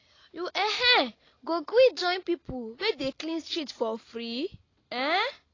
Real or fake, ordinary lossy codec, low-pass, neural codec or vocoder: real; AAC, 32 kbps; 7.2 kHz; none